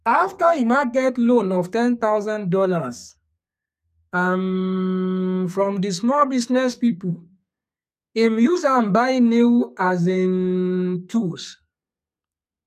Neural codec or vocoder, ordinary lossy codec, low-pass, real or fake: codec, 32 kHz, 1.9 kbps, SNAC; none; 14.4 kHz; fake